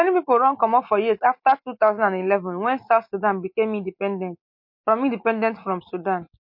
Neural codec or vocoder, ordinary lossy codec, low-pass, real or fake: none; MP3, 32 kbps; 5.4 kHz; real